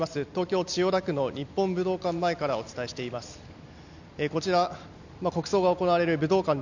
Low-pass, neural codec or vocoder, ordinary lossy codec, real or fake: 7.2 kHz; none; none; real